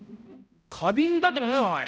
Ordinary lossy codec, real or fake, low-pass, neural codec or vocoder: none; fake; none; codec, 16 kHz, 0.5 kbps, X-Codec, HuBERT features, trained on balanced general audio